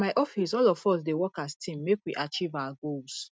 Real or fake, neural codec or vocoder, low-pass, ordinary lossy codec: real; none; none; none